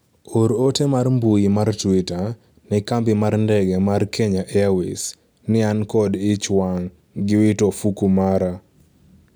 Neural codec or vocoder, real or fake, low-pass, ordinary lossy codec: none; real; none; none